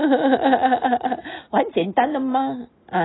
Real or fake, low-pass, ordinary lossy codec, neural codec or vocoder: real; 7.2 kHz; AAC, 16 kbps; none